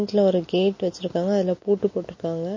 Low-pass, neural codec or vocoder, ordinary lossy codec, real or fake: 7.2 kHz; none; MP3, 32 kbps; real